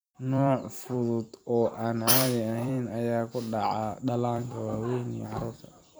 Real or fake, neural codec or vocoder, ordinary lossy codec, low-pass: fake; vocoder, 44.1 kHz, 128 mel bands every 256 samples, BigVGAN v2; none; none